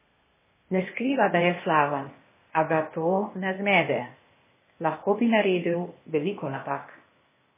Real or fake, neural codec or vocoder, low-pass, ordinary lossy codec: fake; codec, 16 kHz, 0.8 kbps, ZipCodec; 3.6 kHz; MP3, 16 kbps